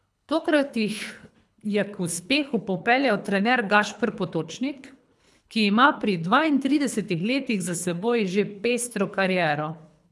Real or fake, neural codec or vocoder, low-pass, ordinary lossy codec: fake; codec, 24 kHz, 3 kbps, HILCodec; none; none